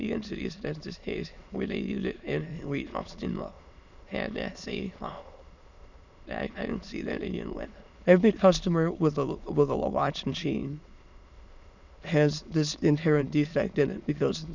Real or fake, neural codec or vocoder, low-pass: fake; autoencoder, 22.05 kHz, a latent of 192 numbers a frame, VITS, trained on many speakers; 7.2 kHz